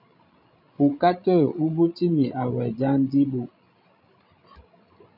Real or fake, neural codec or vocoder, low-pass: fake; codec, 16 kHz, 16 kbps, FreqCodec, larger model; 5.4 kHz